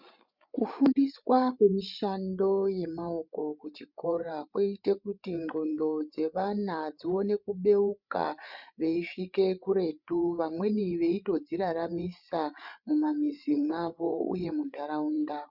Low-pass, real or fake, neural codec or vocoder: 5.4 kHz; fake; codec, 16 kHz, 8 kbps, FreqCodec, larger model